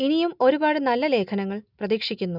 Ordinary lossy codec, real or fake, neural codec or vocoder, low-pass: none; real; none; 5.4 kHz